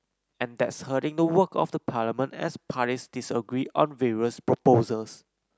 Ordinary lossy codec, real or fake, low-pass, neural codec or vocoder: none; real; none; none